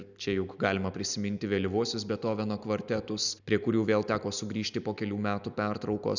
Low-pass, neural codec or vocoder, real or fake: 7.2 kHz; none; real